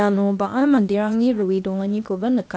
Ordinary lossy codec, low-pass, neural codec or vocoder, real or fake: none; none; codec, 16 kHz, 0.8 kbps, ZipCodec; fake